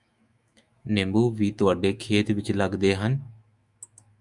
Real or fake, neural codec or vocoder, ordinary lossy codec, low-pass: fake; autoencoder, 48 kHz, 128 numbers a frame, DAC-VAE, trained on Japanese speech; Opus, 64 kbps; 10.8 kHz